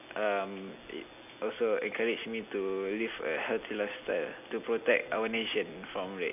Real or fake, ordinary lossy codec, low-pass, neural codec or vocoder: real; none; 3.6 kHz; none